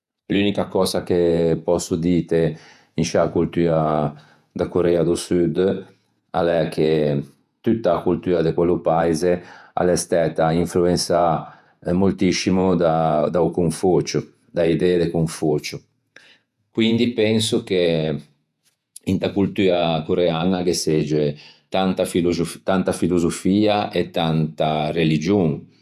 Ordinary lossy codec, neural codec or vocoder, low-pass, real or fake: none; vocoder, 48 kHz, 128 mel bands, Vocos; 14.4 kHz; fake